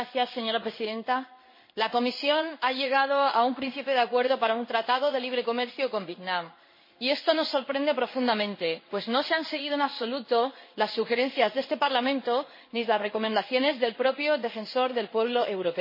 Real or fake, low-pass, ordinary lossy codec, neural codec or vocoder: fake; 5.4 kHz; MP3, 24 kbps; codec, 16 kHz in and 24 kHz out, 1 kbps, XY-Tokenizer